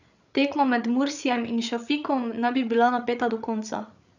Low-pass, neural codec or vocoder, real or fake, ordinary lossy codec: 7.2 kHz; codec, 16 kHz, 8 kbps, FreqCodec, larger model; fake; none